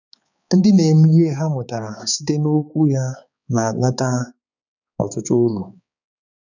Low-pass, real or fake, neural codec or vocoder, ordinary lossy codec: 7.2 kHz; fake; codec, 16 kHz, 4 kbps, X-Codec, HuBERT features, trained on balanced general audio; none